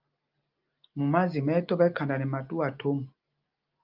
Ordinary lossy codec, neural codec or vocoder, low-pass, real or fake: Opus, 24 kbps; none; 5.4 kHz; real